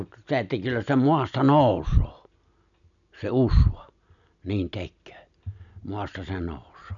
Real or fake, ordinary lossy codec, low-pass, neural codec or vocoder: real; none; 7.2 kHz; none